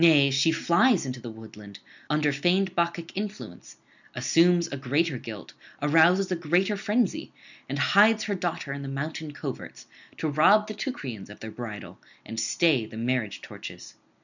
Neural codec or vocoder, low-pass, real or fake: none; 7.2 kHz; real